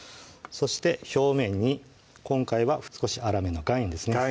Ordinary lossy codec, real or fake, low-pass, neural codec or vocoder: none; real; none; none